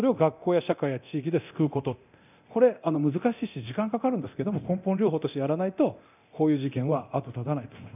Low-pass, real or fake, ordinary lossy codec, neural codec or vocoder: 3.6 kHz; fake; none; codec, 24 kHz, 0.9 kbps, DualCodec